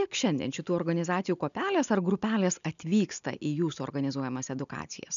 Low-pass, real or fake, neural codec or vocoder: 7.2 kHz; real; none